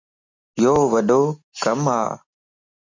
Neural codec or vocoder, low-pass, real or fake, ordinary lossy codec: none; 7.2 kHz; real; MP3, 48 kbps